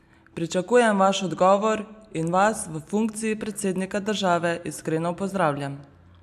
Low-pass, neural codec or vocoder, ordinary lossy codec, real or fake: 14.4 kHz; none; AAC, 96 kbps; real